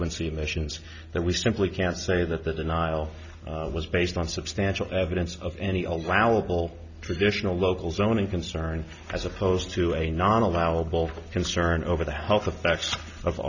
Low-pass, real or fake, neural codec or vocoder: 7.2 kHz; real; none